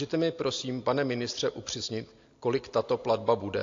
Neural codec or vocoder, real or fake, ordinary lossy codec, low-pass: none; real; AAC, 48 kbps; 7.2 kHz